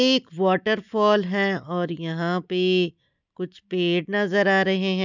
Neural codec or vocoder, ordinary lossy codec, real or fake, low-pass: none; none; real; 7.2 kHz